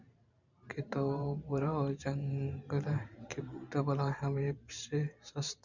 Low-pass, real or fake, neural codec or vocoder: 7.2 kHz; fake; vocoder, 44.1 kHz, 128 mel bands every 512 samples, BigVGAN v2